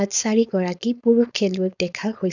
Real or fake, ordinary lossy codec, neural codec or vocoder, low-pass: fake; none; codec, 16 kHz, 4.8 kbps, FACodec; 7.2 kHz